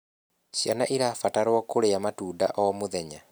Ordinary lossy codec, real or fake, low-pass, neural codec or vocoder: none; real; none; none